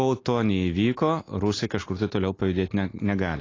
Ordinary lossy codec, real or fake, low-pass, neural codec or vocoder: AAC, 32 kbps; real; 7.2 kHz; none